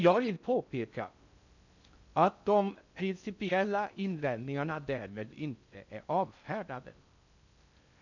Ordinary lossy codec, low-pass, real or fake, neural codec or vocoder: none; 7.2 kHz; fake; codec, 16 kHz in and 24 kHz out, 0.6 kbps, FocalCodec, streaming, 4096 codes